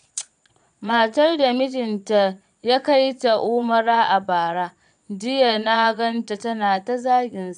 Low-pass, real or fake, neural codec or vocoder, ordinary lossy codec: 9.9 kHz; fake; vocoder, 22.05 kHz, 80 mel bands, Vocos; none